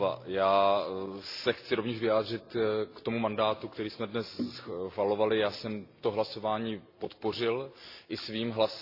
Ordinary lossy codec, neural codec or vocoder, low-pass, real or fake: AAC, 32 kbps; none; 5.4 kHz; real